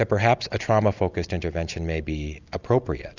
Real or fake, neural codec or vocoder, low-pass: real; none; 7.2 kHz